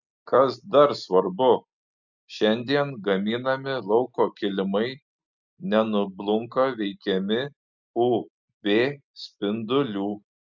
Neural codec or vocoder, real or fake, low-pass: none; real; 7.2 kHz